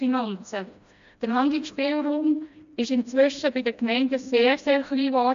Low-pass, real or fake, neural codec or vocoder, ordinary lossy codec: 7.2 kHz; fake; codec, 16 kHz, 1 kbps, FreqCodec, smaller model; AAC, 64 kbps